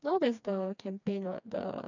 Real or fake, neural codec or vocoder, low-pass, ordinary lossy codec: fake; codec, 16 kHz, 2 kbps, FreqCodec, smaller model; 7.2 kHz; none